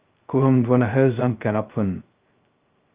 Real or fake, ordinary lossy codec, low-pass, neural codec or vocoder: fake; Opus, 24 kbps; 3.6 kHz; codec, 16 kHz, 0.2 kbps, FocalCodec